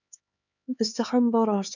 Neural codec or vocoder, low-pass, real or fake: codec, 16 kHz, 4 kbps, X-Codec, HuBERT features, trained on LibriSpeech; 7.2 kHz; fake